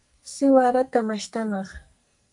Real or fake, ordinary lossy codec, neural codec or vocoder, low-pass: fake; MP3, 96 kbps; codec, 44.1 kHz, 2.6 kbps, SNAC; 10.8 kHz